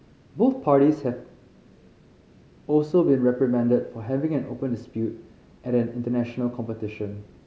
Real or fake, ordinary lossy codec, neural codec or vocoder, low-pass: real; none; none; none